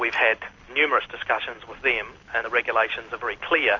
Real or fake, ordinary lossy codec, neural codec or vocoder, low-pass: real; MP3, 48 kbps; none; 7.2 kHz